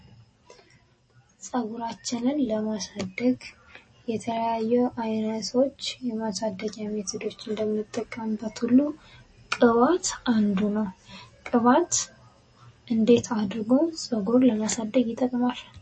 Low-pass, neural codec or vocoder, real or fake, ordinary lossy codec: 9.9 kHz; none; real; MP3, 32 kbps